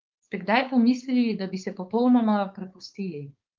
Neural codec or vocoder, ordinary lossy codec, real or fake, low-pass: codec, 16 kHz, 4.8 kbps, FACodec; Opus, 32 kbps; fake; 7.2 kHz